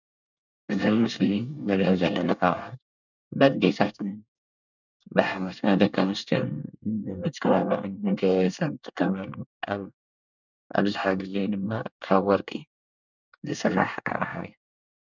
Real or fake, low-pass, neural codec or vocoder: fake; 7.2 kHz; codec, 24 kHz, 1 kbps, SNAC